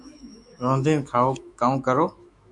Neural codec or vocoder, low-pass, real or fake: autoencoder, 48 kHz, 128 numbers a frame, DAC-VAE, trained on Japanese speech; 10.8 kHz; fake